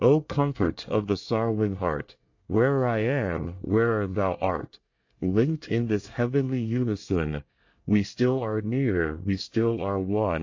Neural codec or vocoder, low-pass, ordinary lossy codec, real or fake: codec, 24 kHz, 1 kbps, SNAC; 7.2 kHz; AAC, 48 kbps; fake